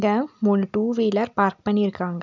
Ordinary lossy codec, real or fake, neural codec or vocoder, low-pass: none; real; none; 7.2 kHz